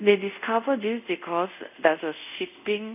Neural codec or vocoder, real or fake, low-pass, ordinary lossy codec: codec, 24 kHz, 0.5 kbps, DualCodec; fake; 3.6 kHz; none